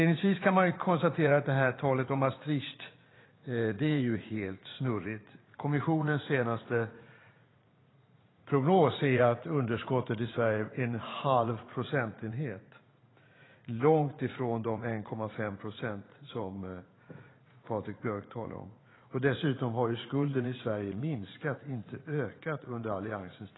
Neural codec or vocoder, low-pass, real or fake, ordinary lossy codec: vocoder, 44.1 kHz, 80 mel bands, Vocos; 7.2 kHz; fake; AAC, 16 kbps